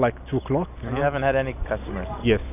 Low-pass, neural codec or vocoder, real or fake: 3.6 kHz; none; real